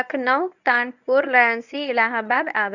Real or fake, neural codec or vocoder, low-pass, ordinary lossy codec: fake; codec, 24 kHz, 0.9 kbps, WavTokenizer, medium speech release version 1; 7.2 kHz; none